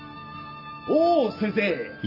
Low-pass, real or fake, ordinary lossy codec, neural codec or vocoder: 5.4 kHz; real; none; none